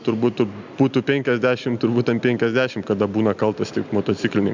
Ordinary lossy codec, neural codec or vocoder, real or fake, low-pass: MP3, 64 kbps; none; real; 7.2 kHz